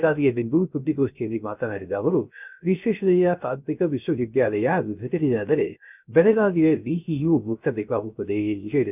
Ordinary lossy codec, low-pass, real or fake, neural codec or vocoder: none; 3.6 kHz; fake; codec, 16 kHz, 0.3 kbps, FocalCodec